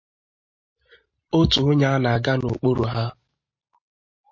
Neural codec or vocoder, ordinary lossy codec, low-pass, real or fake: none; MP3, 32 kbps; 7.2 kHz; real